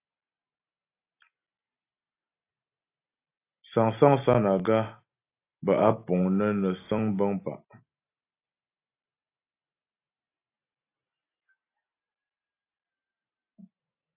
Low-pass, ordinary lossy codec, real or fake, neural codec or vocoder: 3.6 kHz; AAC, 24 kbps; real; none